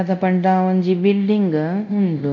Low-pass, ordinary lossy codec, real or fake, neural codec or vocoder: 7.2 kHz; none; fake; codec, 24 kHz, 0.9 kbps, DualCodec